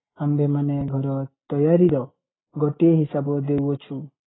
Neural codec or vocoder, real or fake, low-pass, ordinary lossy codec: none; real; 7.2 kHz; AAC, 16 kbps